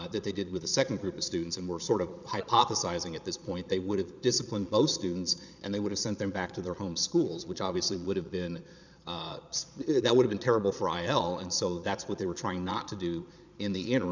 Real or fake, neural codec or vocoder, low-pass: fake; vocoder, 22.05 kHz, 80 mel bands, Vocos; 7.2 kHz